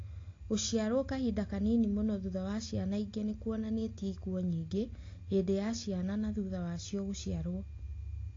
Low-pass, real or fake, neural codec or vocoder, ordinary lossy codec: 7.2 kHz; real; none; AAC, 32 kbps